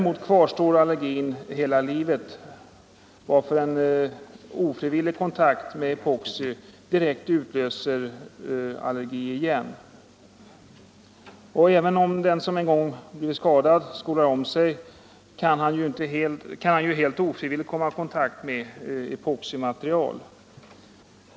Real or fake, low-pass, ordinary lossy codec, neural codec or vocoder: real; none; none; none